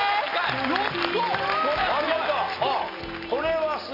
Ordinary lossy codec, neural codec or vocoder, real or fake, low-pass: AAC, 24 kbps; none; real; 5.4 kHz